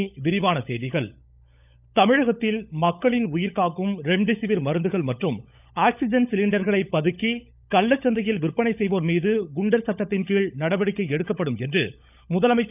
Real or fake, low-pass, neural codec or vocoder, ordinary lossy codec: fake; 3.6 kHz; codec, 16 kHz, 16 kbps, FunCodec, trained on LibriTTS, 50 frames a second; none